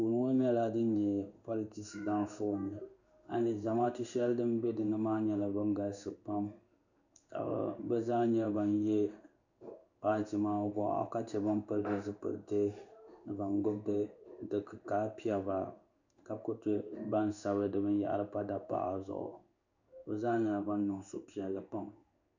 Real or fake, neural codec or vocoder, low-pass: fake; codec, 16 kHz in and 24 kHz out, 1 kbps, XY-Tokenizer; 7.2 kHz